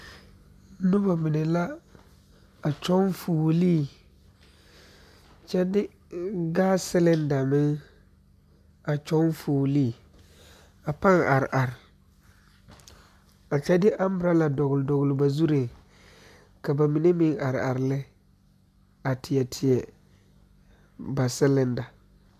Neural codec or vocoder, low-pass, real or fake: none; 14.4 kHz; real